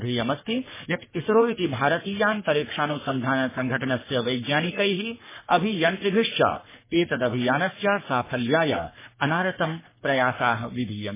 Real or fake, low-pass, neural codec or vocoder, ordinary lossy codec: fake; 3.6 kHz; codec, 44.1 kHz, 3.4 kbps, Pupu-Codec; MP3, 16 kbps